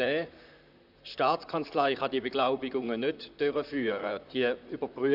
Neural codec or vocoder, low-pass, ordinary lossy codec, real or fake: vocoder, 44.1 kHz, 128 mel bands, Pupu-Vocoder; 5.4 kHz; none; fake